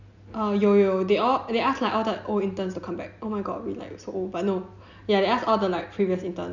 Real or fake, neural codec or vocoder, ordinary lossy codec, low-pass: real; none; none; 7.2 kHz